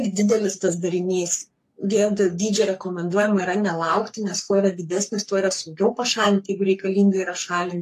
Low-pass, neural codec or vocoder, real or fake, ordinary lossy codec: 14.4 kHz; codec, 44.1 kHz, 3.4 kbps, Pupu-Codec; fake; AAC, 64 kbps